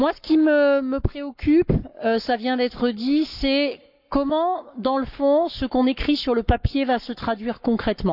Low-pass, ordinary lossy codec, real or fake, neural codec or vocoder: 5.4 kHz; none; fake; codec, 44.1 kHz, 7.8 kbps, Pupu-Codec